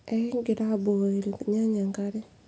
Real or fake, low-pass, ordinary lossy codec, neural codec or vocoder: real; none; none; none